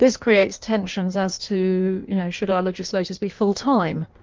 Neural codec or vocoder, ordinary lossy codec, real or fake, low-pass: codec, 16 kHz in and 24 kHz out, 1.1 kbps, FireRedTTS-2 codec; Opus, 24 kbps; fake; 7.2 kHz